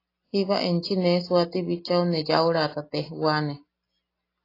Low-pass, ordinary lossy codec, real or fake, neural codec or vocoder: 5.4 kHz; AAC, 24 kbps; real; none